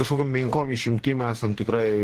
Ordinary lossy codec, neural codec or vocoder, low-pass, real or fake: Opus, 16 kbps; codec, 44.1 kHz, 2.6 kbps, DAC; 14.4 kHz; fake